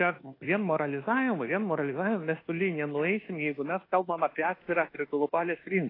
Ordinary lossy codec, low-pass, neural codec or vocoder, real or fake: AAC, 24 kbps; 5.4 kHz; codec, 24 kHz, 1.2 kbps, DualCodec; fake